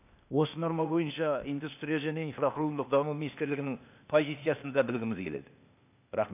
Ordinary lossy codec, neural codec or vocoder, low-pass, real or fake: none; codec, 16 kHz, 0.8 kbps, ZipCodec; 3.6 kHz; fake